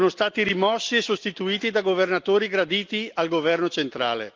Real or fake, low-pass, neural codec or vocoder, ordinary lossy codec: real; 7.2 kHz; none; Opus, 32 kbps